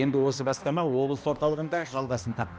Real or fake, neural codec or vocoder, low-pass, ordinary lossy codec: fake; codec, 16 kHz, 1 kbps, X-Codec, HuBERT features, trained on balanced general audio; none; none